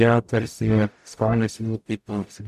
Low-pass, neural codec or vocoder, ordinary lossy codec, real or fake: 14.4 kHz; codec, 44.1 kHz, 0.9 kbps, DAC; MP3, 96 kbps; fake